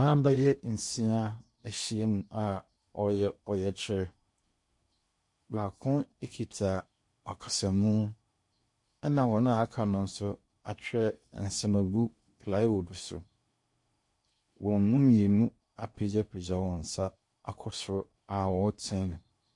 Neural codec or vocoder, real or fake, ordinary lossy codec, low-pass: codec, 16 kHz in and 24 kHz out, 0.8 kbps, FocalCodec, streaming, 65536 codes; fake; MP3, 48 kbps; 10.8 kHz